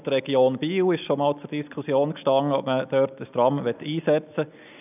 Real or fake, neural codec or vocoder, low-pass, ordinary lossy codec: real; none; 3.6 kHz; none